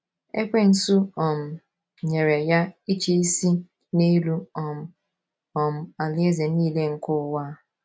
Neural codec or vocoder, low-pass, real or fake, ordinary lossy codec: none; none; real; none